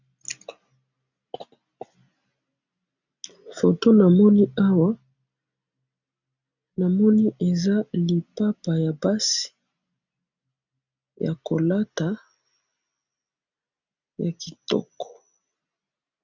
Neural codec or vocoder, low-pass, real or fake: none; 7.2 kHz; real